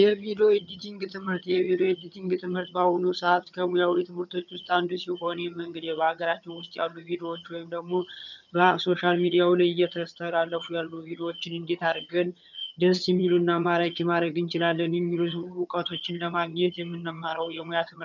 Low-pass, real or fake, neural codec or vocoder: 7.2 kHz; fake; vocoder, 22.05 kHz, 80 mel bands, HiFi-GAN